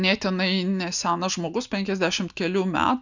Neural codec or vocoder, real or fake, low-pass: none; real; 7.2 kHz